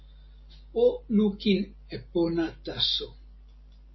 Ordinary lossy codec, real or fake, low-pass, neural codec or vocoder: MP3, 24 kbps; real; 7.2 kHz; none